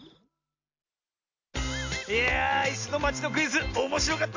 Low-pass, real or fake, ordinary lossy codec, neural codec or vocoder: 7.2 kHz; real; AAC, 48 kbps; none